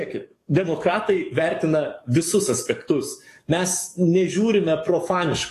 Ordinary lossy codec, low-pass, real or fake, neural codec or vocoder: AAC, 48 kbps; 14.4 kHz; fake; codec, 44.1 kHz, 7.8 kbps, DAC